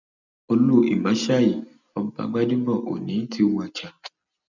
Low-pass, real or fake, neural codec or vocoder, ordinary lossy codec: 7.2 kHz; real; none; none